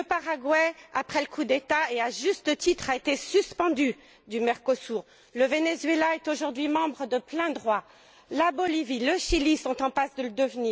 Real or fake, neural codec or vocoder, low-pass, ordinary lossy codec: real; none; none; none